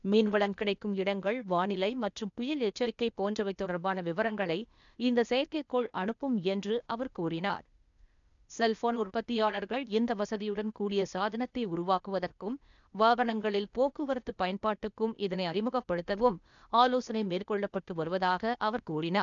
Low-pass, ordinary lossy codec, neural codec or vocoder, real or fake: 7.2 kHz; none; codec, 16 kHz, 0.8 kbps, ZipCodec; fake